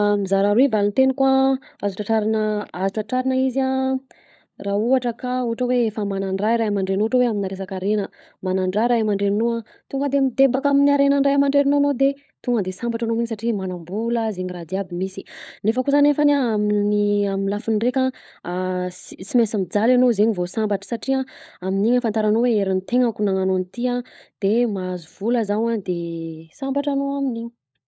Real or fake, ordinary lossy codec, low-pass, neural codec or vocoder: fake; none; none; codec, 16 kHz, 16 kbps, FunCodec, trained on LibriTTS, 50 frames a second